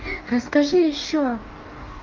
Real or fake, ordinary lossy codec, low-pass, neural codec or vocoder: fake; Opus, 24 kbps; 7.2 kHz; codec, 44.1 kHz, 2.6 kbps, DAC